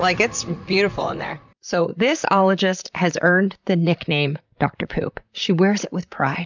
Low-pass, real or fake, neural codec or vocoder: 7.2 kHz; fake; vocoder, 44.1 kHz, 128 mel bands, Pupu-Vocoder